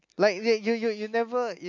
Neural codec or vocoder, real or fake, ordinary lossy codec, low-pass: none; real; none; 7.2 kHz